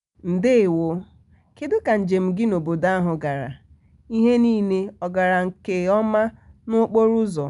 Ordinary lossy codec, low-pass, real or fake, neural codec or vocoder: none; 10.8 kHz; real; none